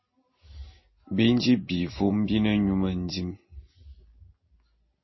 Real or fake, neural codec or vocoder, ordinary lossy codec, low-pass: real; none; MP3, 24 kbps; 7.2 kHz